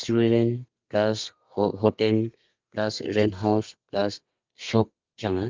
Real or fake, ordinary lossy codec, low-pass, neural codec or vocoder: fake; Opus, 24 kbps; 7.2 kHz; codec, 32 kHz, 1.9 kbps, SNAC